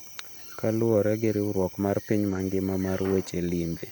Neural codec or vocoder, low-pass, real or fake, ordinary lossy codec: vocoder, 44.1 kHz, 128 mel bands every 256 samples, BigVGAN v2; none; fake; none